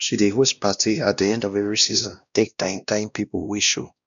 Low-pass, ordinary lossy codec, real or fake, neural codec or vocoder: 7.2 kHz; none; fake; codec, 16 kHz, 1 kbps, X-Codec, WavLM features, trained on Multilingual LibriSpeech